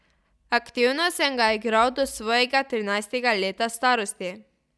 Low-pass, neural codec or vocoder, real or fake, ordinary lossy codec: none; none; real; none